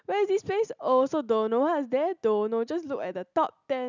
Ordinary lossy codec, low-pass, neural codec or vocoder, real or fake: none; 7.2 kHz; none; real